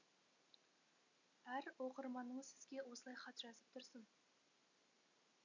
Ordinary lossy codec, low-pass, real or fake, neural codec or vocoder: none; 7.2 kHz; real; none